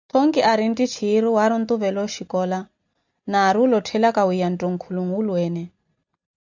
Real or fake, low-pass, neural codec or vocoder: real; 7.2 kHz; none